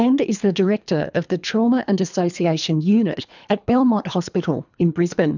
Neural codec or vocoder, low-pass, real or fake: codec, 24 kHz, 3 kbps, HILCodec; 7.2 kHz; fake